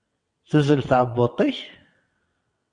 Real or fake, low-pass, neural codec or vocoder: fake; 9.9 kHz; vocoder, 22.05 kHz, 80 mel bands, WaveNeXt